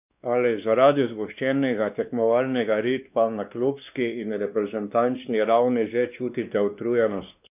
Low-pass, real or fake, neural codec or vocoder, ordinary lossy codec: 3.6 kHz; fake; codec, 16 kHz, 2 kbps, X-Codec, WavLM features, trained on Multilingual LibriSpeech; none